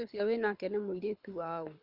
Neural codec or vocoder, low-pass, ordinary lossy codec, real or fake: codec, 24 kHz, 6 kbps, HILCodec; 5.4 kHz; none; fake